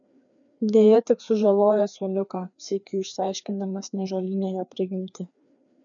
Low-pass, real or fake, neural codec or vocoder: 7.2 kHz; fake; codec, 16 kHz, 2 kbps, FreqCodec, larger model